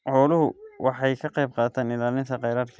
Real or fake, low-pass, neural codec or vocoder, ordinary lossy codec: real; none; none; none